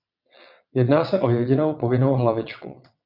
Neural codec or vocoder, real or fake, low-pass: vocoder, 22.05 kHz, 80 mel bands, WaveNeXt; fake; 5.4 kHz